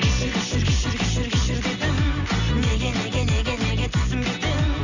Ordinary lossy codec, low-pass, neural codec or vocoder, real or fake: none; 7.2 kHz; none; real